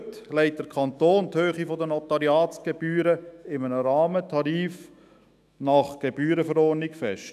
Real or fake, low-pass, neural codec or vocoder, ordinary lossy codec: fake; 14.4 kHz; autoencoder, 48 kHz, 128 numbers a frame, DAC-VAE, trained on Japanese speech; none